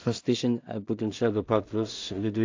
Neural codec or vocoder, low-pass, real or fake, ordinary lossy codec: codec, 16 kHz in and 24 kHz out, 0.4 kbps, LongCat-Audio-Codec, two codebook decoder; 7.2 kHz; fake; AAC, 48 kbps